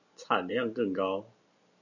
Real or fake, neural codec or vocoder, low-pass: real; none; 7.2 kHz